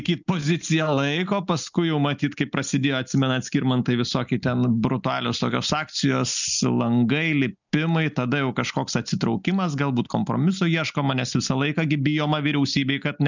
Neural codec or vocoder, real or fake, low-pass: none; real; 7.2 kHz